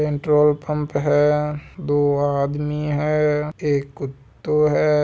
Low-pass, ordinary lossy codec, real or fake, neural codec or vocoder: none; none; real; none